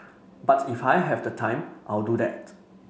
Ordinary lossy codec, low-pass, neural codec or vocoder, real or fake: none; none; none; real